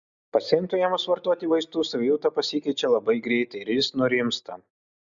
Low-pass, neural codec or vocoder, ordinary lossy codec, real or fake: 7.2 kHz; none; MP3, 96 kbps; real